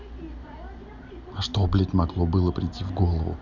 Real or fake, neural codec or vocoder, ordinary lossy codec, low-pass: real; none; none; 7.2 kHz